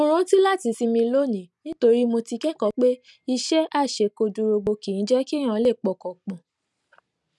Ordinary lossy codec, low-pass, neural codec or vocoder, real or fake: none; none; none; real